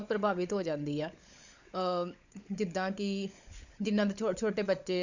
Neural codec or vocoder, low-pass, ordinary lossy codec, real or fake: codec, 16 kHz, 16 kbps, FunCodec, trained on LibriTTS, 50 frames a second; 7.2 kHz; Opus, 64 kbps; fake